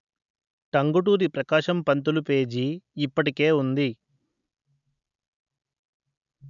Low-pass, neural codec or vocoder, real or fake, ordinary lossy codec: 7.2 kHz; none; real; none